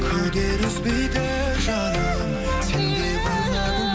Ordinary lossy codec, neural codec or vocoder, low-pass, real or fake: none; none; none; real